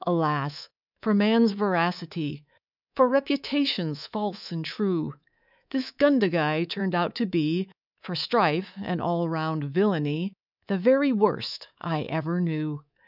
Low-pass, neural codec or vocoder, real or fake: 5.4 kHz; codec, 24 kHz, 3.1 kbps, DualCodec; fake